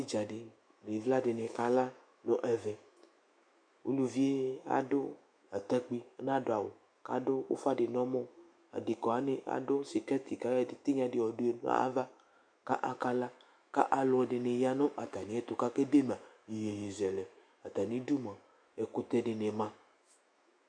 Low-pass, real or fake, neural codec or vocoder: 9.9 kHz; fake; autoencoder, 48 kHz, 128 numbers a frame, DAC-VAE, trained on Japanese speech